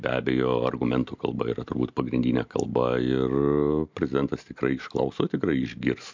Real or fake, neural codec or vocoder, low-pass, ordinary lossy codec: real; none; 7.2 kHz; MP3, 64 kbps